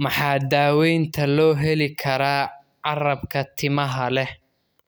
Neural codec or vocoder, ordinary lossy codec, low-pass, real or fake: none; none; none; real